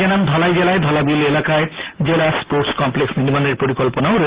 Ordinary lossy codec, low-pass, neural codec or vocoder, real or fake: Opus, 16 kbps; 3.6 kHz; none; real